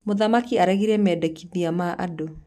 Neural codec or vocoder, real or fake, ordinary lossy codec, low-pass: none; real; none; 14.4 kHz